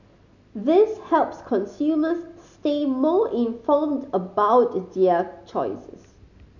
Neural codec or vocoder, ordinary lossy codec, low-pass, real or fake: none; none; 7.2 kHz; real